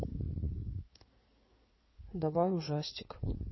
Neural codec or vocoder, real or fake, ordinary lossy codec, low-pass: codec, 16 kHz in and 24 kHz out, 2.2 kbps, FireRedTTS-2 codec; fake; MP3, 24 kbps; 7.2 kHz